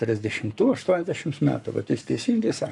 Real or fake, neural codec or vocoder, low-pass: fake; codec, 44.1 kHz, 7.8 kbps, Pupu-Codec; 10.8 kHz